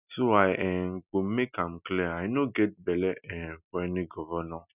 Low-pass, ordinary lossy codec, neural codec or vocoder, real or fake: 3.6 kHz; none; none; real